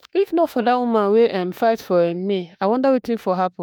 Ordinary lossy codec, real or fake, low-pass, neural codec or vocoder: none; fake; none; autoencoder, 48 kHz, 32 numbers a frame, DAC-VAE, trained on Japanese speech